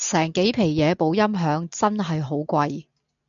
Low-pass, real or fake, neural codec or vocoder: 7.2 kHz; real; none